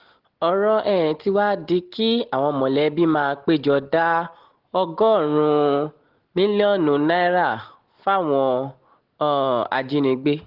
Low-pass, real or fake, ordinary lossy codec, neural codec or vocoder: 5.4 kHz; real; Opus, 16 kbps; none